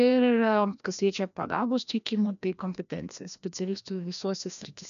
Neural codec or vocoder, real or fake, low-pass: codec, 16 kHz, 1 kbps, FreqCodec, larger model; fake; 7.2 kHz